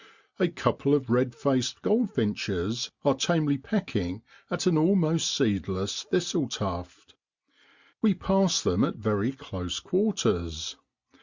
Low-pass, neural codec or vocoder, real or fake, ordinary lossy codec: 7.2 kHz; none; real; Opus, 64 kbps